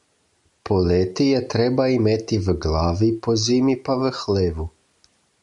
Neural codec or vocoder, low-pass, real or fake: none; 10.8 kHz; real